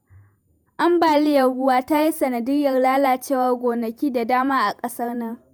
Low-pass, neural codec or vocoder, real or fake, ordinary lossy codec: none; vocoder, 48 kHz, 128 mel bands, Vocos; fake; none